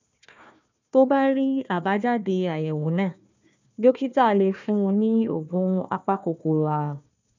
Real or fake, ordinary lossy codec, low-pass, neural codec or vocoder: fake; none; 7.2 kHz; codec, 16 kHz, 1 kbps, FunCodec, trained on Chinese and English, 50 frames a second